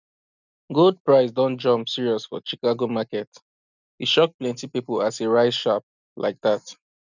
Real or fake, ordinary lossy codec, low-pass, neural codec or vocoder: real; none; 7.2 kHz; none